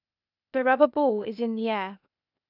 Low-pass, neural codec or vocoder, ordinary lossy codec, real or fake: 5.4 kHz; codec, 16 kHz, 0.8 kbps, ZipCodec; none; fake